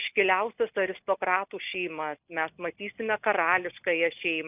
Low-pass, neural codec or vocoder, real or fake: 3.6 kHz; none; real